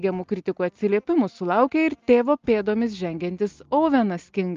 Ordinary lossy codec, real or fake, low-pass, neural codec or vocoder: Opus, 32 kbps; real; 7.2 kHz; none